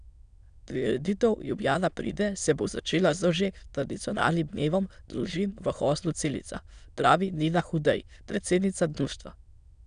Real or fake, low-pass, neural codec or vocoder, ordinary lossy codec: fake; 9.9 kHz; autoencoder, 22.05 kHz, a latent of 192 numbers a frame, VITS, trained on many speakers; none